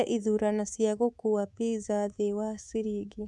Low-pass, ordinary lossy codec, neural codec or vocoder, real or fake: none; none; codec, 24 kHz, 3.1 kbps, DualCodec; fake